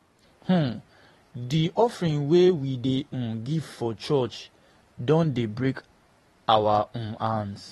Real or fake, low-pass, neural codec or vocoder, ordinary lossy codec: fake; 19.8 kHz; vocoder, 44.1 kHz, 128 mel bands every 512 samples, BigVGAN v2; AAC, 32 kbps